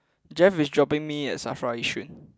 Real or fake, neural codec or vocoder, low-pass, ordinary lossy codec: real; none; none; none